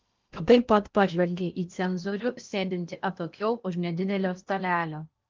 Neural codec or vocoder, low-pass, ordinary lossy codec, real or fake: codec, 16 kHz in and 24 kHz out, 0.8 kbps, FocalCodec, streaming, 65536 codes; 7.2 kHz; Opus, 32 kbps; fake